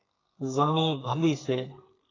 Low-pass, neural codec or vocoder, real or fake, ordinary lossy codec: 7.2 kHz; codec, 32 kHz, 1.9 kbps, SNAC; fake; AAC, 32 kbps